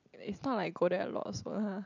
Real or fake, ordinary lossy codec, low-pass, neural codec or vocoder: real; none; 7.2 kHz; none